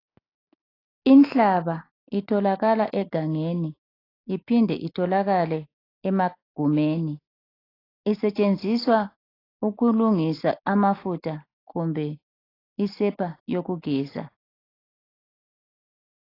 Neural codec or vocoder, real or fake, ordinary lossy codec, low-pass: none; real; AAC, 24 kbps; 5.4 kHz